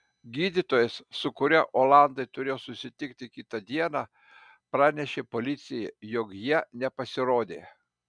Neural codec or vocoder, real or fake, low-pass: none; real; 9.9 kHz